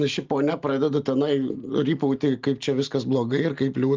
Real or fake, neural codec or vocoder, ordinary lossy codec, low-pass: real; none; Opus, 24 kbps; 7.2 kHz